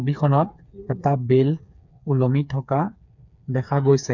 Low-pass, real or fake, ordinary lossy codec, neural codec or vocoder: 7.2 kHz; fake; none; codec, 16 kHz, 4 kbps, FreqCodec, smaller model